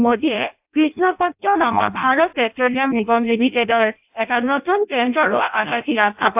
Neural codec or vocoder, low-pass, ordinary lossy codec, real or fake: codec, 16 kHz in and 24 kHz out, 0.6 kbps, FireRedTTS-2 codec; 3.6 kHz; AAC, 32 kbps; fake